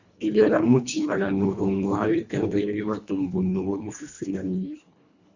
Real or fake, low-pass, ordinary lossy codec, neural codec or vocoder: fake; 7.2 kHz; Opus, 64 kbps; codec, 24 kHz, 1.5 kbps, HILCodec